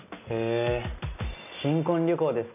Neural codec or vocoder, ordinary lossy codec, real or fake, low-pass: none; none; real; 3.6 kHz